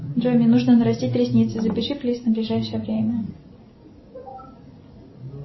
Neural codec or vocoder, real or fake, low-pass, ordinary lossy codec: none; real; 7.2 kHz; MP3, 24 kbps